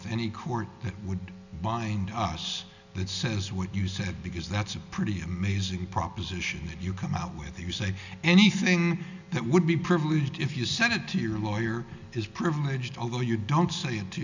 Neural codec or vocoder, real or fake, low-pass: none; real; 7.2 kHz